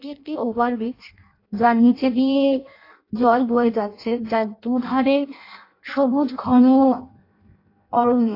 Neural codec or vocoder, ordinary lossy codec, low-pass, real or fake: codec, 16 kHz in and 24 kHz out, 0.6 kbps, FireRedTTS-2 codec; AAC, 32 kbps; 5.4 kHz; fake